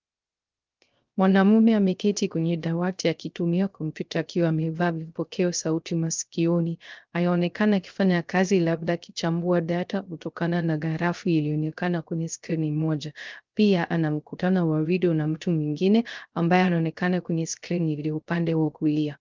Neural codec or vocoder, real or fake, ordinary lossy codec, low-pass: codec, 16 kHz, 0.3 kbps, FocalCodec; fake; Opus, 32 kbps; 7.2 kHz